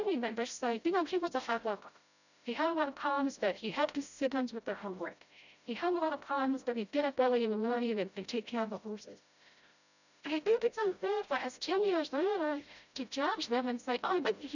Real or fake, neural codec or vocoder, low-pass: fake; codec, 16 kHz, 0.5 kbps, FreqCodec, smaller model; 7.2 kHz